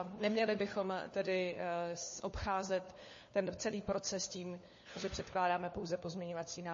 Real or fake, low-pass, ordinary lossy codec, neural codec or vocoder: fake; 7.2 kHz; MP3, 32 kbps; codec, 16 kHz, 4 kbps, FunCodec, trained on LibriTTS, 50 frames a second